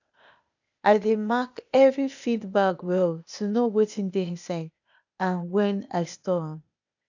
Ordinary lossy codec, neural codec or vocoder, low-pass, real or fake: none; codec, 16 kHz, 0.8 kbps, ZipCodec; 7.2 kHz; fake